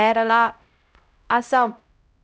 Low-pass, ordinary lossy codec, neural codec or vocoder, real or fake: none; none; codec, 16 kHz, 0.5 kbps, X-Codec, HuBERT features, trained on LibriSpeech; fake